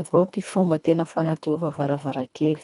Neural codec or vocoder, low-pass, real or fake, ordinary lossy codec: codec, 24 kHz, 1.5 kbps, HILCodec; 10.8 kHz; fake; none